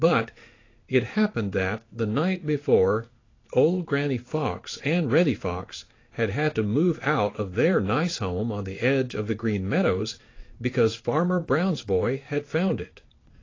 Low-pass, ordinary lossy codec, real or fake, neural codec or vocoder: 7.2 kHz; AAC, 32 kbps; fake; codec, 16 kHz in and 24 kHz out, 1 kbps, XY-Tokenizer